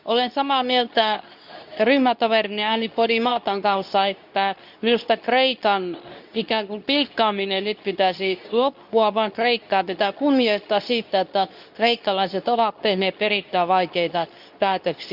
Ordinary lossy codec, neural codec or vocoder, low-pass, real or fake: none; codec, 24 kHz, 0.9 kbps, WavTokenizer, medium speech release version 2; 5.4 kHz; fake